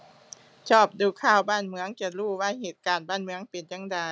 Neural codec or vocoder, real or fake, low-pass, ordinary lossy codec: none; real; none; none